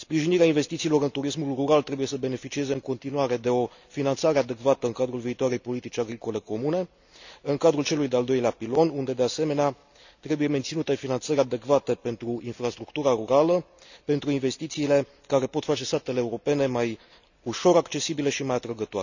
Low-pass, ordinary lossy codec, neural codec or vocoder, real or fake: 7.2 kHz; none; none; real